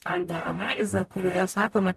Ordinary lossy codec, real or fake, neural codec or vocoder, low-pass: MP3, 96 kbps; fake; codec, 44.1 kHz, 0.9 kbps, DAC; 14.4 kHz